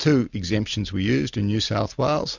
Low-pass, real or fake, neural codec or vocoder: 7.2 kHz; real; none